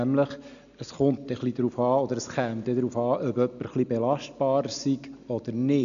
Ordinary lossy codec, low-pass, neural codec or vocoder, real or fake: none; 7.2 kHz; none; real